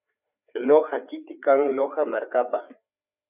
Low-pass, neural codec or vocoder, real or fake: 3.6 kHz; codec, 16 kHz, 4 kbps, FreqCodec, larger model; fake